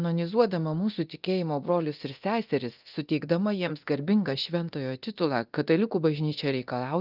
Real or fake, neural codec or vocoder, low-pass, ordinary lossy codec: fake; codec, 24 kHz, 0.9 kbps, DualCodec; 5.4 kHz; Opus, 32 kbps